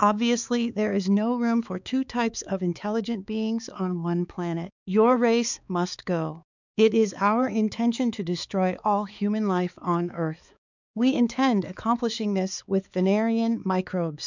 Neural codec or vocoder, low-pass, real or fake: codec, 16 kHz, 4 kbps, X-Codec, HuBERT features, trained on balanced general audio; 7.2 kHz; fake